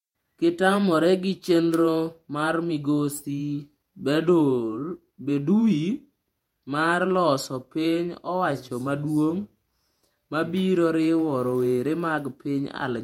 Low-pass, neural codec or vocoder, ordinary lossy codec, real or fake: 19.8 kHz; vocoder, 48 kHz, 128 mel bands, Vocos; MP3, 64 kbps; fake